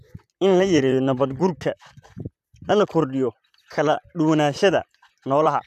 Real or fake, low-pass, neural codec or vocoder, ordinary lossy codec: fake; 14.4 kHz; vocoder, 44.1 kHz, 128 mel bands, Pupu-Vocoder; none